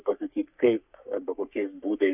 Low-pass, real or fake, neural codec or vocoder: 3.6 kHz; fake; codec, 44.1 kHz, 3.4 kbps, Pupu-Codec